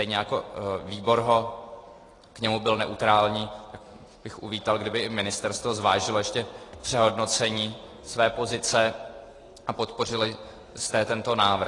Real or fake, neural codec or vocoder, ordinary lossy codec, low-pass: real; none; AAC, 32 kbps; 10.8 kHz